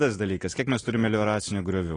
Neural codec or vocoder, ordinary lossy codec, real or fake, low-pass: none; AAC, 32 kbps; real; 9.9 kHz